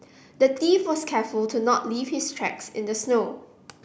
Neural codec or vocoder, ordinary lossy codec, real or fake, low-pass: none; none; real; none